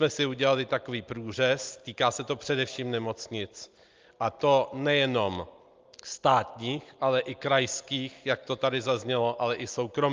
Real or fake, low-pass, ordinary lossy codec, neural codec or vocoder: real; 7.2 kHz; Opus, 24 kbps; none